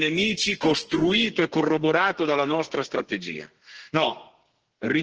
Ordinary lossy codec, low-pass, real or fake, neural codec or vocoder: Opus, 16 kbps; 7.2 kHz; fake; codec, 32 kHz, 1.9 kbps, SNAC